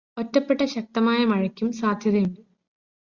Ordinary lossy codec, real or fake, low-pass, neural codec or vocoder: Opus, 64 kbps; real; 7.2 kHz; none